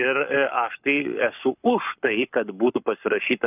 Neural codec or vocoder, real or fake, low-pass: codec, 44.1 kHz, 7.8 kbps, Pupu-Codec; fake; 3.6 kHz